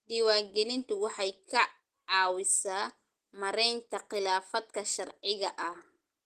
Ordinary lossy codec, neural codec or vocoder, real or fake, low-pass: Opus, 16 kbps; none; real; 14.4 kHz